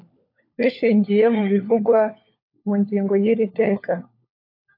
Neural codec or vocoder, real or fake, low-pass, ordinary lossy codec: codec, 16 kHz, 4 kbps, FunCodec, trained on LibriTTS, 50 frames a second; fake; 5.4 kHz; AAC, 32 kbps